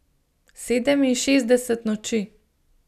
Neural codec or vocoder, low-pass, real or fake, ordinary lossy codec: none; 14.4 kHz; real; none